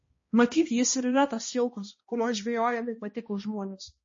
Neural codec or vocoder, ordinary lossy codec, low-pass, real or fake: codec, 16 kHz, 1 kbps, X-Codec, HuBERT features, trained on balanced general audio; MP3, 32 kbps; 7.2 kHz; fake